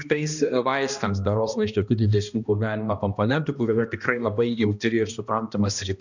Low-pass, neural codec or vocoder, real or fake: 7.2 kHz; codec, 16 kHz, 1 kbps, X-Codec, HuBERT features, trained on balanced general audio; fake